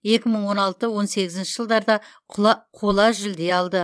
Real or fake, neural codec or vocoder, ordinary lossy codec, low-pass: fake; vocoder, 22.05 kHz, 80 mel bands, WaveNeXt; none; none